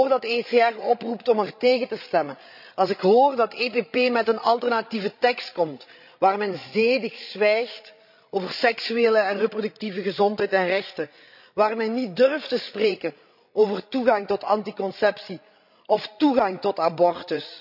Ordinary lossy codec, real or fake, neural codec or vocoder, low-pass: none; fake; codec, 16 kHz, 16 kbps, FreqCodec, larger model; 5.4 kHz